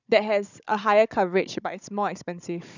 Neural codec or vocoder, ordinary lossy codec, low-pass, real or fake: codec, 16 kHz, 16 kbps, FunCodec, trained on Chinese and English, 50 frames a second; none; 7.2 kHz; fake